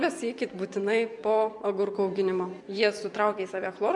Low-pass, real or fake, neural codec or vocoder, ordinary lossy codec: 10.8 kHz; fake; vocoder, 24 kHz, 100 mel bands, Vocos; MP3, 64 kbps